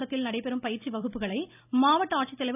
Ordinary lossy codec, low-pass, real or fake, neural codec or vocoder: none; 3.6 kHz; real; none